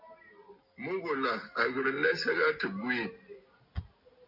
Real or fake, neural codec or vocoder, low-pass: real; none; 5.4 kHz